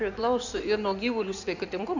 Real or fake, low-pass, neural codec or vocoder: fake; 7.2 kHz; codec, 16 kHz in and 24 kHz out, 2.2 kbps, FireRedTTS-2 codec